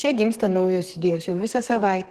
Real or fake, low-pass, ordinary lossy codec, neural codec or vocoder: fake; 14.4 kHz; Opus, 16 kbps; codec, 32 kHz, 1.9 kbps, SNAC